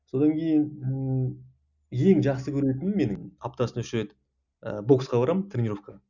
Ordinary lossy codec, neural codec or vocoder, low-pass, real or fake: none; none; 7.2 kHz; real